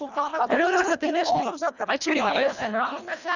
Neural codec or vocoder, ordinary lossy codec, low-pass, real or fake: codec, 24 kHz, 1.5 kbps, HILCodec; none; 7.2 kHz; fake